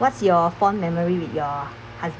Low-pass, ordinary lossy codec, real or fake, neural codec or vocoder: none; none; real; none